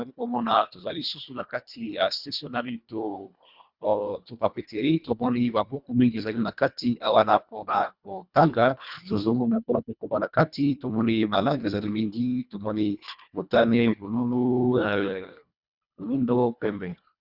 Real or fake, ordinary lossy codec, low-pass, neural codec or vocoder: fake; Opus, 64 kbps; 5.4 kHz; codec, 24 kHz, 1.5 kbps, HILCodec